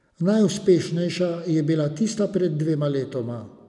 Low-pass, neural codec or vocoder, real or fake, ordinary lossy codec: 10.8 kHz; none; real; none